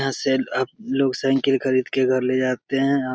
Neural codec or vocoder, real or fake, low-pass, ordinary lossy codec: none; real; none; none